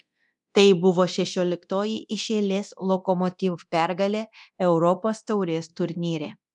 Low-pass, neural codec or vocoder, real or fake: 10.8 kHz; codec, 24 kHz, 0.9 kbps, DualCodec; fake